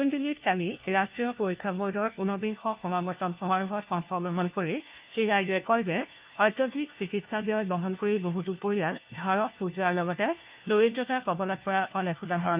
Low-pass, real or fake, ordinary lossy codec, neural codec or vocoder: 3.6 kHz; fake; Opus, 24 kbps; codec, 16 kHz, 1 kbps, FunCodec, trained on LibriTTS, 50 frames a second